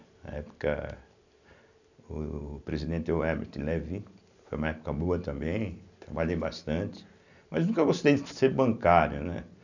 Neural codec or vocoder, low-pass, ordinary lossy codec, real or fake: none; 7.2 kHz; none; real